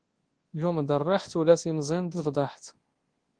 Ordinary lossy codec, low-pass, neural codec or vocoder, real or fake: Opus, 16 kbps; 9.9 kHz; codec, 24 kHz, 0.9 kbps, WavTokenizer, large speech release; fake